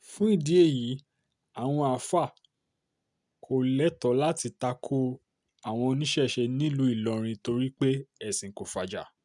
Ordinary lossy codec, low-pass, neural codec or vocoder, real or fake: none; 10.8 kHz; none; real